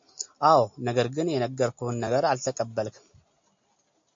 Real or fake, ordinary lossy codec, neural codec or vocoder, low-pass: real; MP3, 48 kbps; none; 7.2 kHz